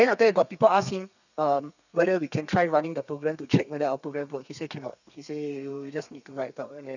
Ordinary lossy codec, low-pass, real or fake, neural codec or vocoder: none; 7.2 kHz; fake; codec, 32 kHz, 1.9 kbps, SNAC